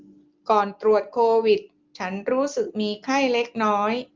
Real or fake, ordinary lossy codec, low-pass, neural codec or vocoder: real; Opus, 24 kbps; 7.2 kHz; none